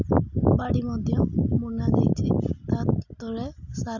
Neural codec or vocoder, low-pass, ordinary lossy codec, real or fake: none; 7.2 kHz; none; real